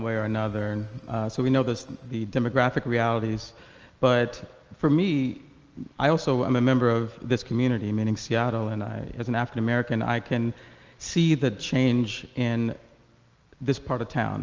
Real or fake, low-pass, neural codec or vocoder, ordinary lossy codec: real; 7.2 kHz; none; Opus, 24 kbps